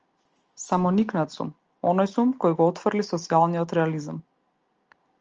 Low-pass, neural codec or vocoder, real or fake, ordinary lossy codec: 7.2 kHz; none; real; Opus, 24 kbps